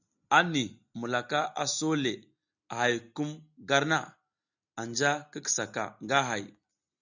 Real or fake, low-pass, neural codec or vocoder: real; 7.2 kHz; none